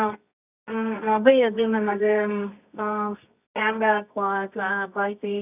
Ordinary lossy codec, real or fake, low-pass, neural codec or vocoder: none; fake; 3.6 kHz; codec, 24 kHz, 0.9 kbps, WavTokenizer, medium music audio release